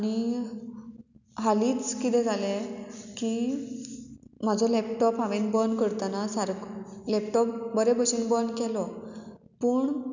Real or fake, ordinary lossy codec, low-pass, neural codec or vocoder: real; none; 7.2 kHz; none